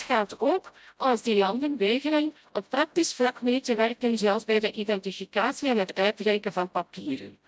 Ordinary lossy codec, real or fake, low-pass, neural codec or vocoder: none; fake; none; codec, 16 kHz, 0.5 kbps, FreqCodec, smaller model